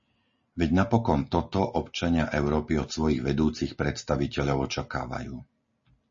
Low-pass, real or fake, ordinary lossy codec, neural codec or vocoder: 7.2 kHz; real; MP3, 32 kbps; none